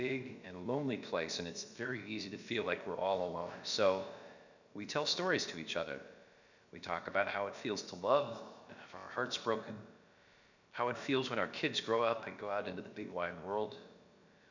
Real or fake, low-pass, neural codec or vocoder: fake; 7.2 kHz; codec, 16 kHz, about 1 kbps, DyCAST, with the encoder's durations